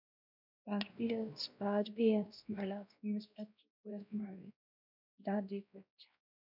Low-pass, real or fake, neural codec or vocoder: 5.4 kHz; fake; codec, 16 kHz, 1 kbps, X-Codec, WavLM features, trained on Multilingual LibriSpeech